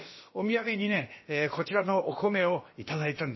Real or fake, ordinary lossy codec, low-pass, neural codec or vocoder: fake; MP3, 24 kbps; 7.2 kHz; codec, 16 kHz, about 1 kbps, DyCAST, with the encoder's durations